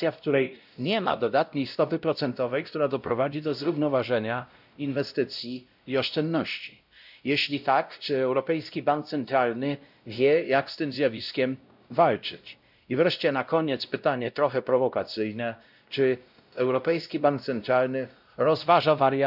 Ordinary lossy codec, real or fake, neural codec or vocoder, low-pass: none; fake; codec, 16 kHz, 0.5 kbps, X-Codec, WavLM features, trained on Multilingual LibriSpeech; 5.4 kHz